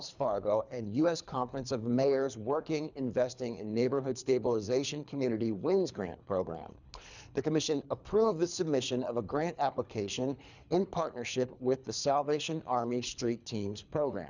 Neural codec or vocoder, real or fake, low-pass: codec, 24 kHz, 3 kbps, HILCodec; fake; 7.2 kHz